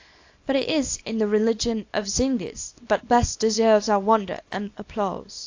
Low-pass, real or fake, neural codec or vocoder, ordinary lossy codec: 7.2 kHz; fake; codec, 24 kHz, 0.9 kbps, WavTokenizer, small release; AAC, 48 kbps